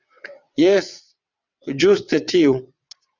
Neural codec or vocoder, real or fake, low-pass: vocoder, 22.05 kHz, 80 mel bands, WaveNeXt; fake; 7.2 kHz